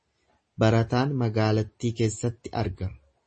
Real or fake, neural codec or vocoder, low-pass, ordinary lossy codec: real; none; 9.9 kHz; MP3, 32 kbps